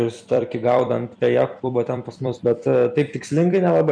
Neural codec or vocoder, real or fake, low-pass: vocoder, 44.1 kHz, 128 mel bands every 256 samples, BigVGAN v2; fake; 9.9 kHz